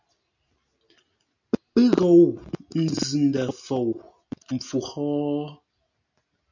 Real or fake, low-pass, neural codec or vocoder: real; 7.2 kHz; none